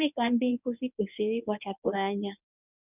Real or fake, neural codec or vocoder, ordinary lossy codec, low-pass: fake; codec, 24 kHz, 0.9 kbps, WavTokenizer, medium speech release version 1; none; 3.6 kHz